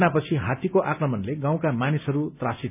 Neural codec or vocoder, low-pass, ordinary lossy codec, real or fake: none; 3.6 kHz; none; real